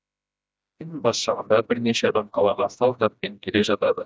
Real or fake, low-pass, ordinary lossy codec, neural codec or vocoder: fake; none; none; codec, 16 kHz, 1 kbps, FreqCodec, smaller model